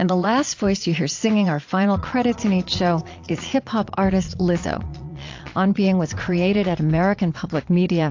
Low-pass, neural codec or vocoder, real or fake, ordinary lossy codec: 7.2 kHz; vocoder, 22.05 kHz, 80 mel bands, Vocos; fake; AAC, 48 kbps